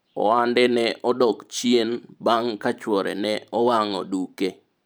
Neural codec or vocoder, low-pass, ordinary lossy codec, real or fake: vocoder, 44.1 kHz, 128 mel bands every 512 samples, BigVGAN v2; none; none; fake